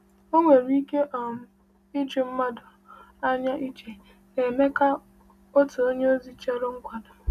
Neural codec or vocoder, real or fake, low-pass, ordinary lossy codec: none; real; 14.4 kHz; none